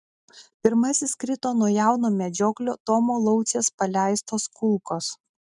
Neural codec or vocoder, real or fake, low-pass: none; real; 10.8 kHz